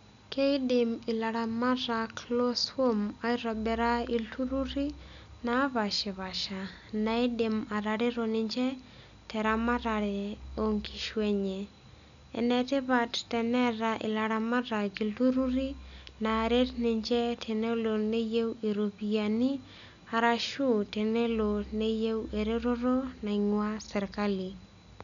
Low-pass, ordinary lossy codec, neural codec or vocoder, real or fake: 7.2 kHz; none; none; real